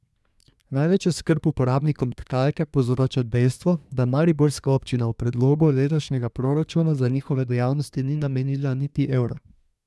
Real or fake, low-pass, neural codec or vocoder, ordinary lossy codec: fake; none; codec, 24 kHz, 1 kbps, SNAC; none